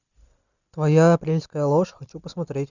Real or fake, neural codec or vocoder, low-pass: real; none; 7.2 kHz